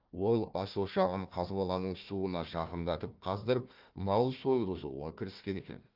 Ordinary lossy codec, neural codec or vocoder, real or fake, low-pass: Opus, 32 kbps; codec, 16 kHz, 1 kbps, FunCodec, trained on Chinese and English, 50 frames a second; fake; 5.4 kHz